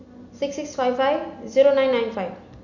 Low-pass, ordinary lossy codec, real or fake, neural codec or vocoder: 7.2 kHz; none; real; none